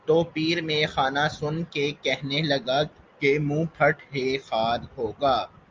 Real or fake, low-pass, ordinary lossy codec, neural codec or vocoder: real; 7.2 kHz; Opus, 24 kbps; none